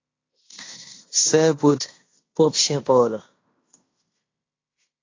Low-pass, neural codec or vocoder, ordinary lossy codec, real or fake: 7.2 kHz; codec, 16 kHz in and 24 kHz out, 0.9 kbps, LongCat-Audio-Codec, fine tuned four codebook decoder; AAC, 32 kbps; fake